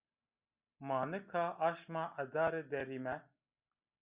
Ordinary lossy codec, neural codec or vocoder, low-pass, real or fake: Opus, 64 kbps; none; 3.6 kHz; real